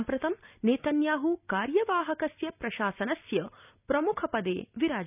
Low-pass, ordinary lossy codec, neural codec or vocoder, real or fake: 3.6 kHz; none; none; real